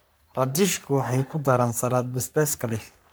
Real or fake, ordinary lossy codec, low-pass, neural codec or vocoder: fake; none; none; codec, 44.1 kHz, 3.4 kbps, Pupu-Codec